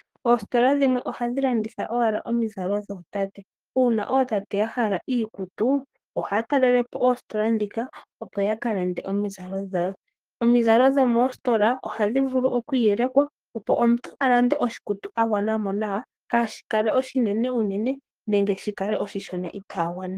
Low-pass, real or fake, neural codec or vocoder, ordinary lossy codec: 14.4 kHz; fake; codec, 32 kHz, 1.9 kbps, SNAC; Opus, 24 kbps